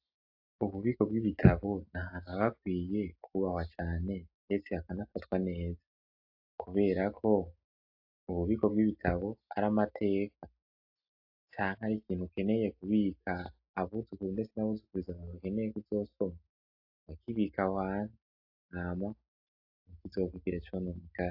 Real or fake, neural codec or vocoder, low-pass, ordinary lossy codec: real; none; 5.4 kHz; AAC, 48 kbps